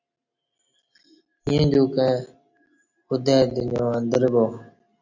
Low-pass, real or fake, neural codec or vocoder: 7.2 kHz; real; none